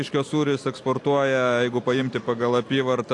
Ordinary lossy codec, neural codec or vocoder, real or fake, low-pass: AAC, 48 kbps; none; real; 10.8 kHz